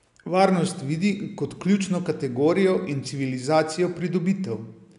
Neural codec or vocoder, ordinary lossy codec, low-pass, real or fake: none; none; 10.8 kHz; real